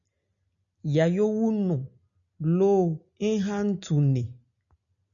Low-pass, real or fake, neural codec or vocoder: 7.2 kHz; real; none